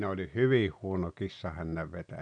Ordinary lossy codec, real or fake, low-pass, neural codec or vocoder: none; real; 9.9 kHz; none